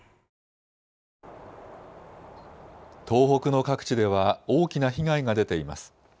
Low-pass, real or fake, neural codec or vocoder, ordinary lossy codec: none; real; none; none